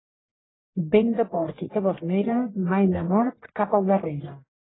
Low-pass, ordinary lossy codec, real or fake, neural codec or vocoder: 7.2 kHz; AAC, 16 kbps; fake; codec, 44.1 kHz, 1.7 kbps, Pupu-Codec